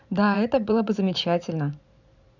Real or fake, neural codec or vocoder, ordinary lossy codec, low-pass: fake; vocoder, 44.1 kHz, 128 mel bands every 512 samples, BigVGAN v2; none; 7.2 kHz